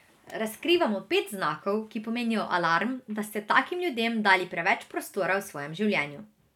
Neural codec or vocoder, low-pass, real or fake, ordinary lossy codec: none; 19.8 kHz; real; none